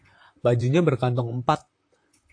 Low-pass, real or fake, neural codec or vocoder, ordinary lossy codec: 9.9 kHz; fake; vocoder, 44.1 kHz, 128 mel bands, Pupu-Vocoder; AAC, 48 kbps